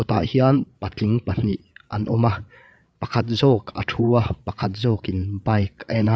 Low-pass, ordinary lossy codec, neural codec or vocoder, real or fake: none; none; codec, 16 kHz, 8 kbps, FreqCodec, larger model; fake